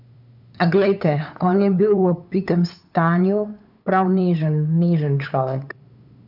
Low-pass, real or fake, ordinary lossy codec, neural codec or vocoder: 5.4 kHz; fake; none; codec, 16 kHz, 8 kbps, FunCodec, trained on LibriTTS, 25 frames a second